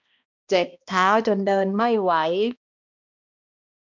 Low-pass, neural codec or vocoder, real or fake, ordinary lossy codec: 7.2 kHz; codec, 16 kHz, 1 kbps, X-Codec, HuBERT features, trained on balanced general audio; fake; none